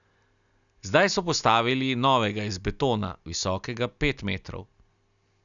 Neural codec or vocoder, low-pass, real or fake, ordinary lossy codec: none; 7.2 kHz; real; none